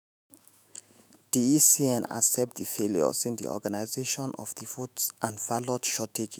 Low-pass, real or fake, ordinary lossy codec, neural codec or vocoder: none; fake; none; autoencoder, 48 kHz, 128 numbers a frame, DAC-VAE, trained on Japanese speech